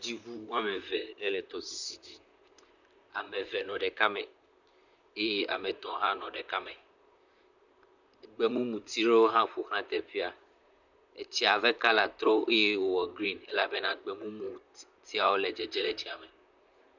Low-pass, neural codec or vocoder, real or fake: 7.2 kHz; vocoder, 44.1 kHz, 128 mel bands, Pupu-Vocoder; fake